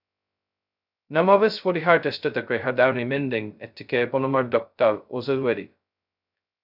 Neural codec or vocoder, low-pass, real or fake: codec, 16 kHz, 0.2 kbps, FocalCodec; 5.4 kHz; fake